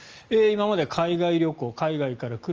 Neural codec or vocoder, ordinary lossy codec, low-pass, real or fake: none; Opus, 24 kbps; 7.2 kHz; real